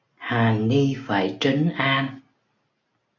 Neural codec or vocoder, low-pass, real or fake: none; 7.2 kHz; real